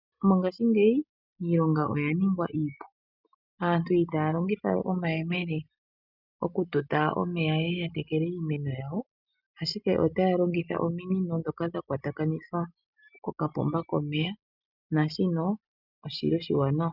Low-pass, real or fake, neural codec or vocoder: 5.4 kHz; real; none